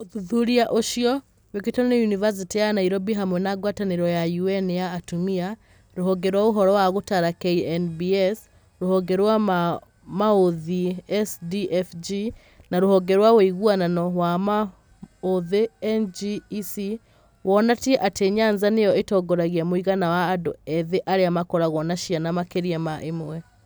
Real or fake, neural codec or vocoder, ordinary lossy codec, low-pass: real; none; none; none